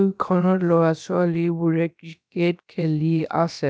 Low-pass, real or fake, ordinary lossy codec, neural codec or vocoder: none; fake; none; codec, 16 kHz, about 1 kbps, DyCAST, with the encoder's durations